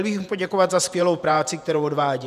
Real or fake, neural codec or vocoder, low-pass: fake; vocoder, 48 kHz, 128 mel bands, Vocos; 14.4 kHz